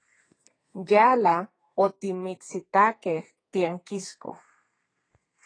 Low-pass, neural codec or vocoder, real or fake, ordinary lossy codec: 9.9 kHz; codec, 32 kHz, 1.9 kbps, SNAC; fake; AAC, 32 kbps